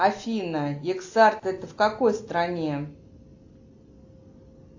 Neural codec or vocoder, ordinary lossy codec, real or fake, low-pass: none; AAC, 48 kbps; real; 7.2 kHz